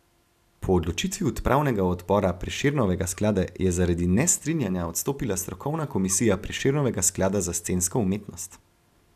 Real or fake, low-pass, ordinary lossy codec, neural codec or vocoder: real; 14.4 kHz; none; none